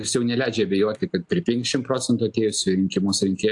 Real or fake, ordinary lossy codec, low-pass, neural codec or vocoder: real; AAC, 64 kbps; 10.8 kHz; none